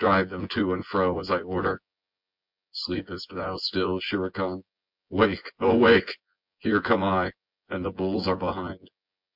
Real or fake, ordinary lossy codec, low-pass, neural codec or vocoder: fake; MP3, 48 kbps; 5.4 kHz; vocoder, 24 kHz, 100 mel bands, Vocos